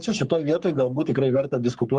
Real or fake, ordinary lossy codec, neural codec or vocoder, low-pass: fake; Opus, 32 kbps; codec, 44.1 kHz, 3.4 kbps, Pupu-Codec; 10.8 kHz